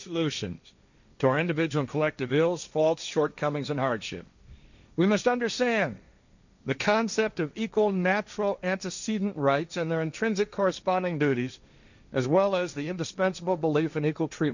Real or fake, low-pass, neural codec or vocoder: fake; 7.2 kHz; codec, 16 kHz, 1.1 kbps, Voila-Tokenizer